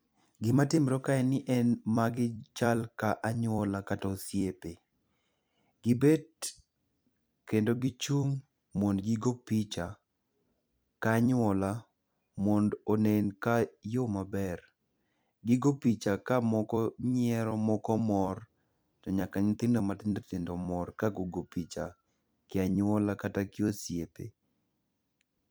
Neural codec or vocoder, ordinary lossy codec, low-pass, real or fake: vocoder, 44.1 kHz, 128 mel bands every 256 samples, BigVGAN v2; none; none; fake